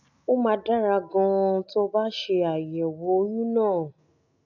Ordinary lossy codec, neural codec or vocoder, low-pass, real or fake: none; none; 7.2 kHz; real